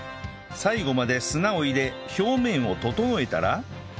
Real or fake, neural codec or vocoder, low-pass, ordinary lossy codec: real; none; none; none